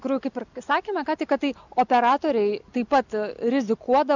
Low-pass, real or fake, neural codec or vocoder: 7.2 kHz; real; none